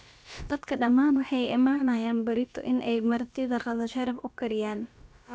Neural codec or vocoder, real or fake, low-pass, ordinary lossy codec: codec, 16 kHz, about 1 kbps, DyCAST, with the encoder's durations; fake; none; none